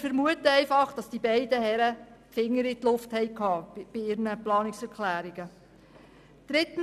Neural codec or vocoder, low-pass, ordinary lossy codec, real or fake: none; 14.4 kHz; none; real